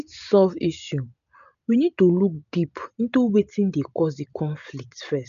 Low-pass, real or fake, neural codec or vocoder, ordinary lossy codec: 7.2 kHz; real; none; none